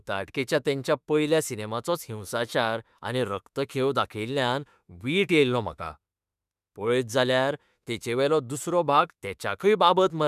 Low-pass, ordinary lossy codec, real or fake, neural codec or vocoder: 14.4 kHz; none; fake; autoencoder, 48 kHz, 32 numbers a frame, DAC-VAE, trained on Japanese speech